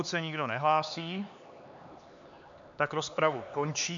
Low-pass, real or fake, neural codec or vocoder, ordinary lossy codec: 7.2 kHz; fake; codec, 16 kHz, 4 kbps, X-Codec, HuBERT features, trained on LibriSpeech; MP3, 64 kbps